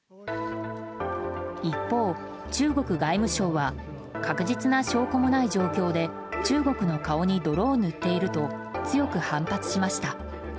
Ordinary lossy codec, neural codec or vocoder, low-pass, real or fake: none; none; none; real